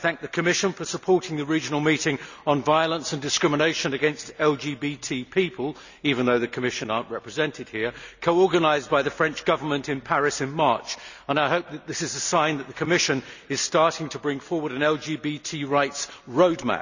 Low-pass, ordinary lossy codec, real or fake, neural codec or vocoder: 7.2 kHz; none; real; none